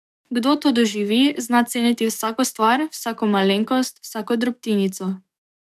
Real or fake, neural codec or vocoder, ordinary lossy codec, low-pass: fake; codec, 44.1 kHz, 7.8 kbps, DAC; none; 14.4 kHz